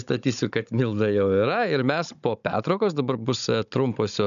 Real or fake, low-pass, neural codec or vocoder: fake; 7.2 kHz; codec, 16 kHz, 16 kbps, FunCodec, trained on Chinese and English, 50 frames a second